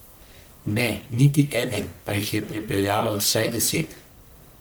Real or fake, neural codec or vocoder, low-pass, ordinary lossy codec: fake; codec, 44.1 kHz, 1.7 kbps, Pupu-Codec; none; none